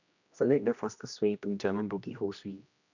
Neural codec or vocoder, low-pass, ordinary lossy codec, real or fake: codec, 16 kHz, 1 kbps, X-Codec, HuBERT features, trained on general audio; 7.2 kHz; none; fake